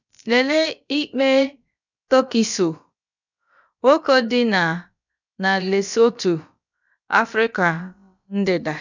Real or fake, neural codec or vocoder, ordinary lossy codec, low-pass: fake; codec, 16 kHz, about 1 kbps, DyCAST, with the encoder's durations; none; 7.2 kHz